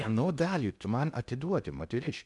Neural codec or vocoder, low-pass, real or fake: codec, 16 kHz in and 24 kHz out, 0.6 kbps, FocalCodec, streaming, 2048 codes; 10.8 kHz; fake